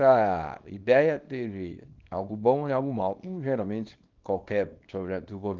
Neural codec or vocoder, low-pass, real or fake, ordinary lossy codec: codec, 24 kHz, 0.9 kbps, WavTokenizer, small release; 7.2 kHz; fake; Opus, 32 kbps